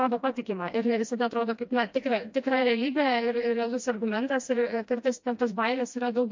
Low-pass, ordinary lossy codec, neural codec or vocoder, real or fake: 7.2 kHz; MP3, 48 kbps; codec, 16 kHz, 1 kbps, FreqCodec, smaller model; fake